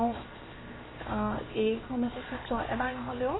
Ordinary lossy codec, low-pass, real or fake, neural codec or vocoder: AAC, 16 kbps; 7.2 kHz; fake; codec, 16 kHz, 0.7 kbps, FocalCodec